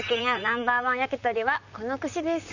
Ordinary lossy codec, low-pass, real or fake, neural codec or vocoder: none; 7.2 kHz; fake; vocoder, 44.1 kHz, 128 mel bands, Pupu-Vocoder